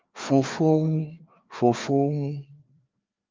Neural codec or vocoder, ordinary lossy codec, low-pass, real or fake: codec, 16 kHz, 2 kbps, FreqCodec, larger model; Opus, 32 kbps; 7.2 kHz; fake